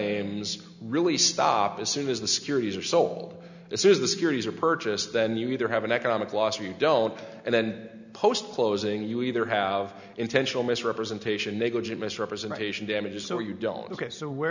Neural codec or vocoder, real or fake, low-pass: none; real; 7.2 kHz